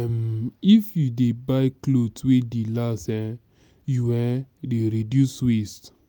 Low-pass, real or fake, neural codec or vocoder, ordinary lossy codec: none; real; none; none